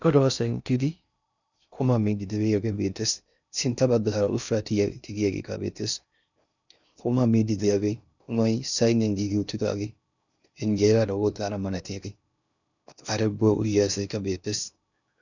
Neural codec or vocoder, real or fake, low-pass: codec, 16 kHz in and 24 kHz out, 0.6 kbps, FocalCodec, streaming, 2048 codes; fake; 7.2 kHz